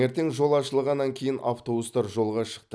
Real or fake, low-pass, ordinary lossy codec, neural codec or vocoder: real; none; none; none